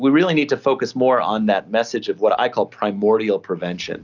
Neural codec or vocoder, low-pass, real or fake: none; 7.2 kHz; real